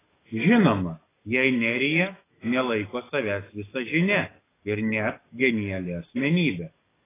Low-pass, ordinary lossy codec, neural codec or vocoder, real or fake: 3.6 kHz; AAC, 16 kbps; codec, 44.1 kHz, 7.8 kbps, DAC; fake